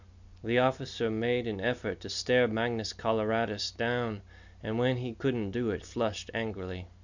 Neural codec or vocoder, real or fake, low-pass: none; real; 7.2 kHz